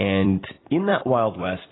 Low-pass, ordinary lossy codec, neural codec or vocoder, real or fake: 7.2 kHz; AAC, 16 kbps; vocoder, 44.1 kHz, 128 mel bands every 256 samples, BigVGAN v2; fake